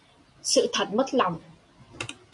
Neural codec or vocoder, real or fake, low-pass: none; real; 10.8 kHz